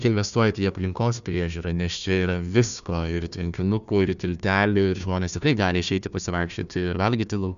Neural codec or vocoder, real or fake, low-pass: codec, 16 kHz, 1 kbps, FunCodec, trained on Chinese and English, 50 frames a second; fake; 7.2 kHz